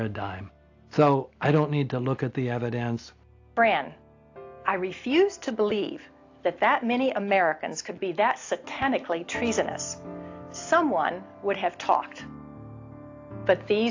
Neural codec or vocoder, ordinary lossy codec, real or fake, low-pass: none; AAC, 48 kbps; real; 7.2 kHz